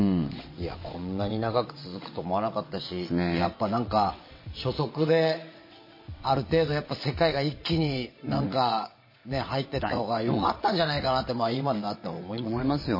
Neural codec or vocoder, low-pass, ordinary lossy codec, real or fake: vocoder, 22.05 kHz, 80 mel bands, WaveNeXt; 5.4 kHz; MP3, 24 kbps; fake